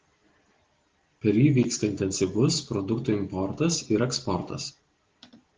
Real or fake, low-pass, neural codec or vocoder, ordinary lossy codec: real; 7.2 kHz; none; Opus, 16 kbps